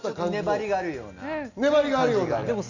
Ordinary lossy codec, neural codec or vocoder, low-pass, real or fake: none; none; 7.2 kHz; real